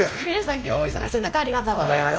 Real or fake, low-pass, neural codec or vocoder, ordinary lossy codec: fake; none; codec, 16 kHz, 1 kbps, X-Codec, WavLM features, trained on Multilingual LibriSpeech; none